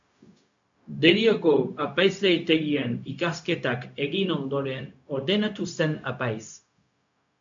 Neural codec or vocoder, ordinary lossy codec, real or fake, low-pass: codec, 16 kHz, 0.4 kbps, LongCat-Audio-Codec; AAC, 64 kbps; fake; 7.2 kHz